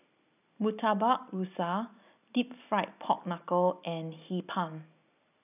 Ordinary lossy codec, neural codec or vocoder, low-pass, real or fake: none; none; 3.6 kHz; real